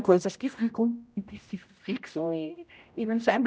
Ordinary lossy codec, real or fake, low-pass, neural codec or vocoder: none; fake; none; codec, 16 kHz, 0.5 kbps, X-Codec, HuBERT features, trained on general audio